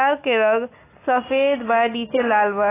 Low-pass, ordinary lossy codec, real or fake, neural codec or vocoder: 3.6 kHz; AAC, 16 kbps; real; none